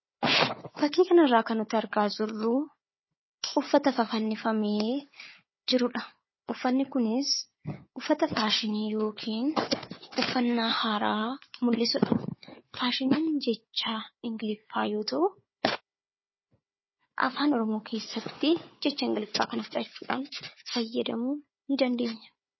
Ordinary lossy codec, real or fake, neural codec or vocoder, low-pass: MP3, 24 kbps; fake; codec, 16 kHz, 4 kbps, FunCodec, trained on Chinese and English, 50 frames a second; 7.2 kHz